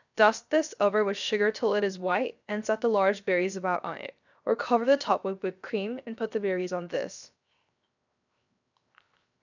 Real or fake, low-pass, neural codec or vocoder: fake; 7.2 kHz; codec, 16 kHz, 0.7 kbps, FocalCodec